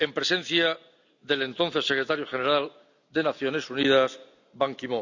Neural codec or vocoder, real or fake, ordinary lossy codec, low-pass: none; real; none; 7.2 kHz